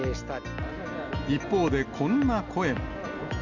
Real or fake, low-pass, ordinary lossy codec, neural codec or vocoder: real; 7.2 kHz; none; none